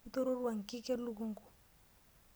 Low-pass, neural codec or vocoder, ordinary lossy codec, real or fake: none; none; none; real